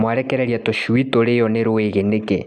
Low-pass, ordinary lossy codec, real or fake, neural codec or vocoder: none; none; real; none